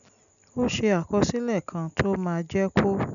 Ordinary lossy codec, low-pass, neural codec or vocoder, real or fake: none; 7.2 kHz; none; real